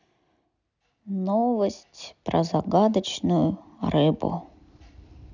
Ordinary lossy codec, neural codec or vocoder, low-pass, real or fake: none; none; 7.2 kHz; real